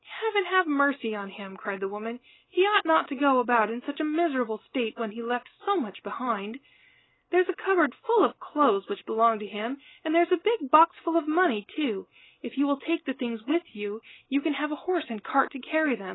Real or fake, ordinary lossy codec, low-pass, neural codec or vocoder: real; AAC, 16 kbps; 7.2 kHz; none